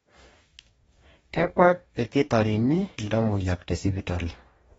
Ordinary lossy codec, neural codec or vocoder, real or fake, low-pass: AAC, 24 kbps; codec, 44.1 kHz, 2.6 kbps, DAC; fake; 19.8 kHz